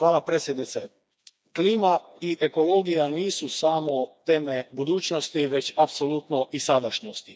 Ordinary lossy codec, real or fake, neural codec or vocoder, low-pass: none; fake; codec, 16 kHz, 2 kbps, FreqCodec, smaller model; none